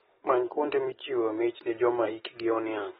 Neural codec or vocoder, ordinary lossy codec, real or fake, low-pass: none; AAC, 16 kbps; real; 10.8 kHz